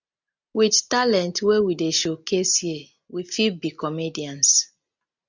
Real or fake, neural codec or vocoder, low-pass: real; none; 7.2 kHz